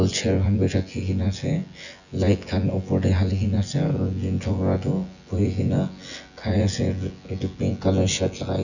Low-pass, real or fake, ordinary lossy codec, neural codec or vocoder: 7.2 kHz; fake; none; vocoder, 24 kHz, 100 mel bands, Vocos